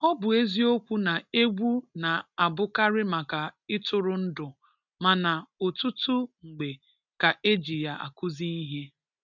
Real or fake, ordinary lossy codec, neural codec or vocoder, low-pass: real; none; none; 7.2 kHz